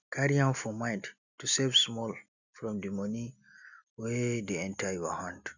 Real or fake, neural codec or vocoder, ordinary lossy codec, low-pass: real; none; none; 7.2 kHz